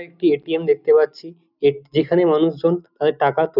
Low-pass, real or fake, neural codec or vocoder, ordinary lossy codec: 5.4 kHz; real; none; none